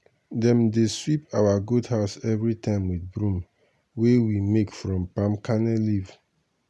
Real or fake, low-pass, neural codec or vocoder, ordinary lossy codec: real; none; none; none